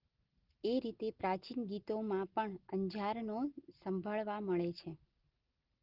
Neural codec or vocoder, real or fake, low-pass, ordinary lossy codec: none; real; 5.4 kHz; Opus, 16 kbps